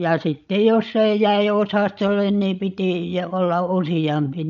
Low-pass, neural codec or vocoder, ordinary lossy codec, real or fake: 7.2 kHz; codec, 16 kHz, 16 kbps, FreqCodec, larger model; none; fake